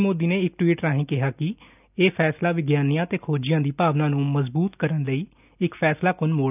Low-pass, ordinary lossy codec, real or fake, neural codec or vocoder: 3.6 kHz; none; real; none